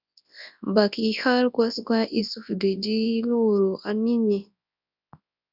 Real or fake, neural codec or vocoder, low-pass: fake; codec, 24 kHz, 0.9 kbps, WavTokenizer, large speech release; 5.4 kHz